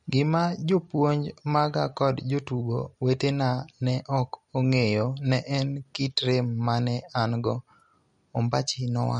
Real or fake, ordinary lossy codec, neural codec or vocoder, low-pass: real; MP3, 48 kbps; none; 19.8 kHz